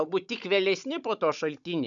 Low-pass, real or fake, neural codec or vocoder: 7.2 kHz; fake; codec, 16 kHz, 16 kbps, FunCodec, trained on Chinese and English, 50 frames a second